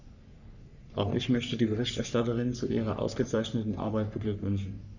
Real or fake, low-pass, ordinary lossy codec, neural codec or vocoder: fake; 7.2 kHz; none; codec, 44.1 kHz, 3.4 kbps, Pupu-Codec